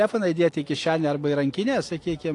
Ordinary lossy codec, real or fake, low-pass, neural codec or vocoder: AAC, 48 kbps; real; 10.8 kHz; none